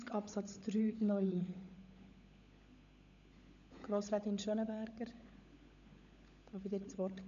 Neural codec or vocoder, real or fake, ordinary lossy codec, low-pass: codec, 16 kHz, 16 kbps, FunCodec, trained on LibriTTS, 50 frames a second; fake; none; 7.2 kHz